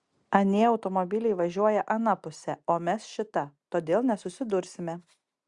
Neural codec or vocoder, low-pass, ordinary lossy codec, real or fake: none; 10.8 kHz; Opus, 64 kbps; real